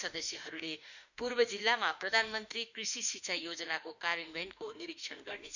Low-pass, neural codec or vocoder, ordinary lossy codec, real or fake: 7.2 kHz; autoencoder, 48 kHz, 32 numbers a frame, DAC-VAE, trained on Japanese speech; none; fake